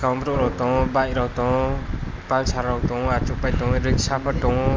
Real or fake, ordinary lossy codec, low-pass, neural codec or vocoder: real; Opus, 24 kbps; 7.2 kHz; none